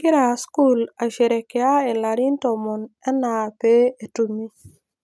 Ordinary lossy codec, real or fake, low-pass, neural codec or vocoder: none; real; none; none